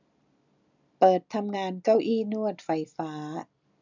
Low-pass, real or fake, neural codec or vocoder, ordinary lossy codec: 7.2 kHz; real; none; none